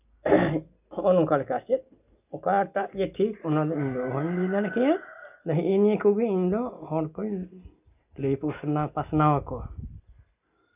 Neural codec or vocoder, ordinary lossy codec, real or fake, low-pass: none; none; real; 3.6 kHz